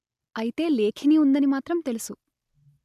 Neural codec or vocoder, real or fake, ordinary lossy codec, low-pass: none; real; none; 14.4 kHz